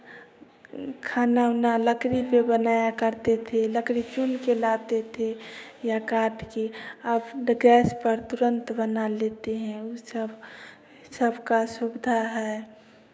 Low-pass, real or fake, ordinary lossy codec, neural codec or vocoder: none; fake; none; codec, 16 kHz, 6 kbps, DAC